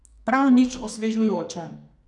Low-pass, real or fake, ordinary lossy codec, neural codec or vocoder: 10.8 kHz; fake; none; codec, 44.1 kHz, 2.6 kbps, SNAC